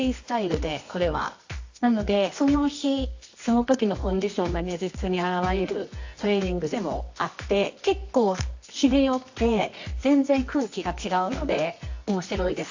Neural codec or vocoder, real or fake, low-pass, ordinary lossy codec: codec, 24 kHz, 0.9 kbps, WavTokenizer, medium music audio release; fake; 7.2 kHz; AAC, 48 kbps